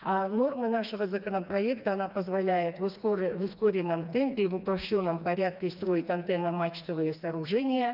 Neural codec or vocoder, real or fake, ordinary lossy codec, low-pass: codec, 16 kHz, 2 kbps, FreqCodec, smaller model; fake; none; 5.4 kHz